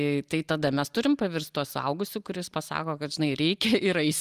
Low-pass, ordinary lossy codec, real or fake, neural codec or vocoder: 14.4 kHz; Opus, 32 kbps; real; none